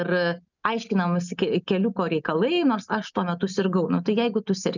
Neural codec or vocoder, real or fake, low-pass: none; real; 7.2 kHz